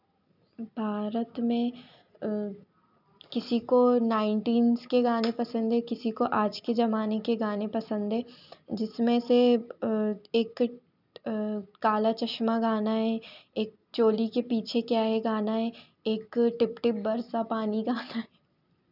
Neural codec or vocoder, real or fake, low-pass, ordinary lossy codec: none; real; 5.4 kHz; none